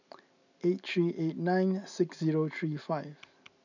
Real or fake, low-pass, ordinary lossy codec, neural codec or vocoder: real; 7.2 kHz; none; none